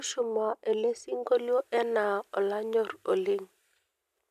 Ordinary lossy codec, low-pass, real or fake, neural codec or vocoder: none; 14.4 kHz; real; none